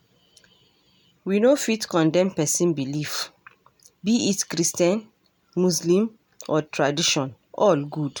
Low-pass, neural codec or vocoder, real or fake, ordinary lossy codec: none; none; real; none